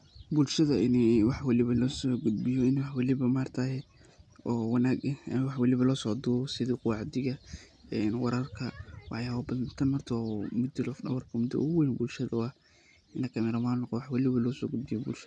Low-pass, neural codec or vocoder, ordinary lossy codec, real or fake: none; none; none; real